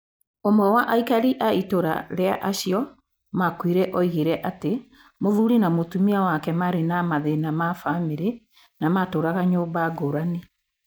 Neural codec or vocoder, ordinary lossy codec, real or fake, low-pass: none; none; real; none